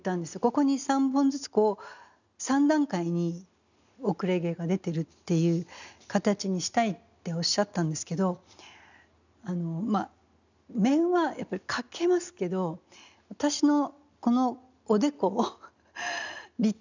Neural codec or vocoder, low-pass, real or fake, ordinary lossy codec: none; 7.2 kHz; real; none